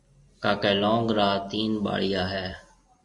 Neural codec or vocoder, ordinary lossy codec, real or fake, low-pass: none; MP3, 48 kbps; real; 10.8 kHz